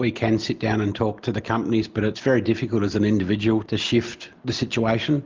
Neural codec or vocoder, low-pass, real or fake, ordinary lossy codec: none; 7.2 kHz; real; Opus, 32 kbps